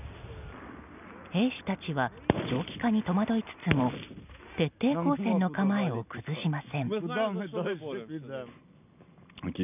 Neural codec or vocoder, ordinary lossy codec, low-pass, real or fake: none; none; 3.6 kHz; real